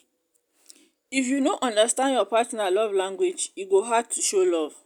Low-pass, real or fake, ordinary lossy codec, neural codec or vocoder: 19.8 kHz; real; none; none